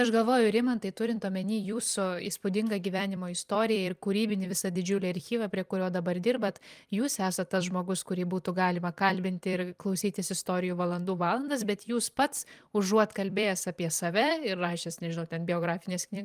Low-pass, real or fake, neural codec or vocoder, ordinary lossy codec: 14.4 kHz; fake; vocoder, 44.1 kHz, 128 mel bands every 256 samples, BigVGAN v2; Opus, 32 kbps